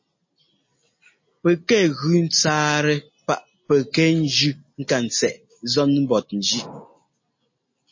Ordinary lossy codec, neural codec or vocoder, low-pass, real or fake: MP3, 32 kbps; none; 7.2 kHz; real